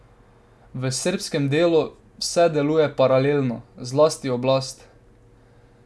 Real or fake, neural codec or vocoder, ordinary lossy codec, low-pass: real; none; none; none